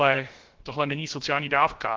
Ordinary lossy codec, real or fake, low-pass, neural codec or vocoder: Opus, 16 kbps; fake; 7.2 kHz; codec, 16 kHz, about 1 kbps, DyCAST, with the encoder's durations